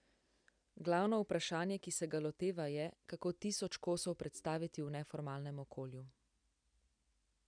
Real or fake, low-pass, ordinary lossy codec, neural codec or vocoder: real; 9.9 kHz; none; none